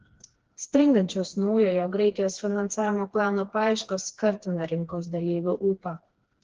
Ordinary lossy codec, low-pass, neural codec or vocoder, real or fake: Opus, 16 kbps; 7.2 kHz; codec, 16 kHz, 2 kbps, FreqCodec, smaller model; fake